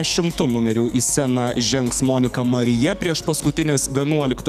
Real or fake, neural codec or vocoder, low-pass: fake; codec, 44.1 kHz, 2.6 kbps, SNAC; 14.4 kHz